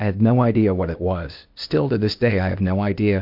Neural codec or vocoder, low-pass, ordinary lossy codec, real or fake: codec, 16 kHz, 0.8 kbps, ZipCodec; 5.4 kHz; MP3, 48 kbps; fake